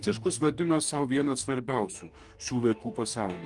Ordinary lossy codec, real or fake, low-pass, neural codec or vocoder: Opus, 32 kbps; fake; 10.8 kHz; codec, 44.1 kHz, 2.6 kbps, DAC